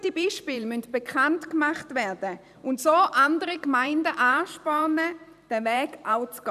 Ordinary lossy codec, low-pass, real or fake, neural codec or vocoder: none; 14.4 kHz; fake; vocoder, 44.1 kHz, 128 mel bands every 512 samples, BigVGAN v2